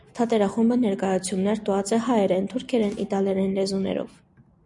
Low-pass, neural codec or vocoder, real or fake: 10.8 kHz; none; real